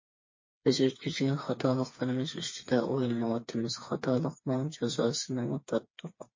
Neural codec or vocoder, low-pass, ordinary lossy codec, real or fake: codec, 16 kHz, 4 kbps, FreqCodec, smaller model; 7.2 kHz; MP3, 32 kbps; fake